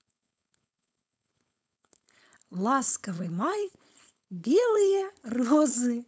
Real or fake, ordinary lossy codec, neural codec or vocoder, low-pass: fake; none; codec, 16 kHz, 4.8 kbps, FACodec; none